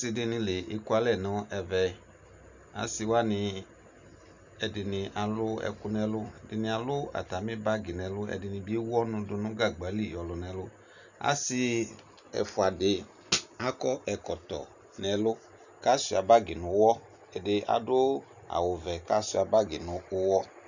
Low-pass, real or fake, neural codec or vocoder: 7.2 kHz; real; none